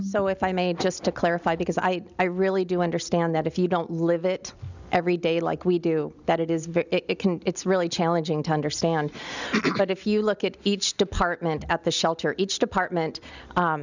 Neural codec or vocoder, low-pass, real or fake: none; 7.2 kHz; real